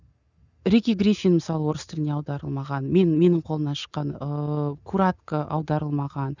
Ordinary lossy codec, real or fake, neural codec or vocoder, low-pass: none; real; none; 7.2 kHz